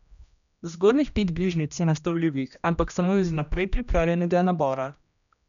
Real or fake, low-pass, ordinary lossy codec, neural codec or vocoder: fake; 7.2 kHz; none; codec, 16 kHz, 1 kbps, X-Codec, HuBERT features, trained on general audio